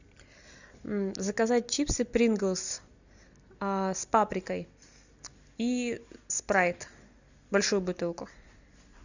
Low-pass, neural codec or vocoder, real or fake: 7.2 kHz; none; real